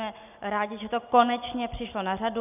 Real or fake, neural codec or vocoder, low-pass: real; none; 3.6 kHz